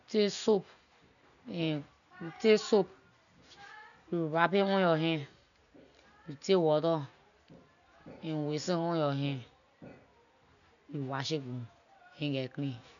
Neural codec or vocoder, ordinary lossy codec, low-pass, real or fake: none; none; 7.2 kHz; real